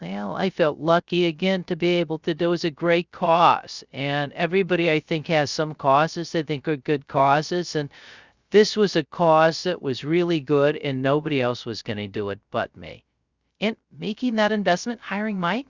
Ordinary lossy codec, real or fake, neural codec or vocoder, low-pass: Opus, 64 kbps; fake; codec, 16 kHz, 0.3 kbps, FocalCodec; 7.2 kHz